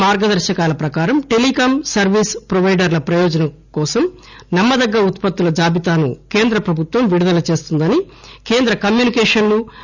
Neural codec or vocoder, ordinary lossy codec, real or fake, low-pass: none; none; real; 7.2 kHz